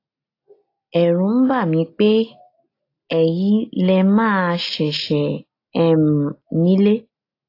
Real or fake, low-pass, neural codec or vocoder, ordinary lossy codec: real; 5.4 kHz; none; AAC, 32 kbps